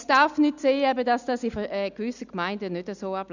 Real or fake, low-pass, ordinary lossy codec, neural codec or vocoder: real; 7.2 kHz; none; none